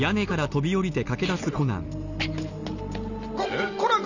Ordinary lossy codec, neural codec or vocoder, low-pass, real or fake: none; none; 7.2 kHz; real